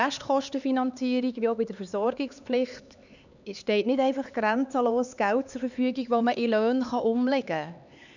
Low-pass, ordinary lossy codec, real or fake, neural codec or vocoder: 7.2 kHz; none; fake; codec, 16 kHz, 4 kbps, X-Codec, HuBERT features, trained on LibriSpeech